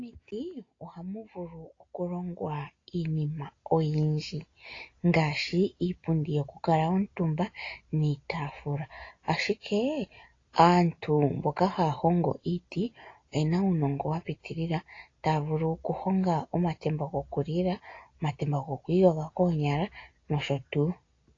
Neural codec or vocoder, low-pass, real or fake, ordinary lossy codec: none; 7.2 kHz; real; AAC, 32 kbps